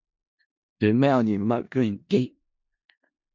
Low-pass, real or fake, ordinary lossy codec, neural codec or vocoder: 7.2 kHz; fake; MP3, 48 kbps; codec, 16 kHz in and 24 kHz out, 0.4 kbps, LongCat-Audio-Codec, four codebook decoder